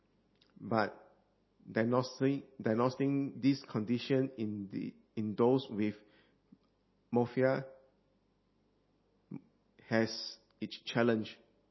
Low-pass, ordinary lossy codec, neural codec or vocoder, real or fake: 7.2 kHz; MP3, 24 kbps; none; real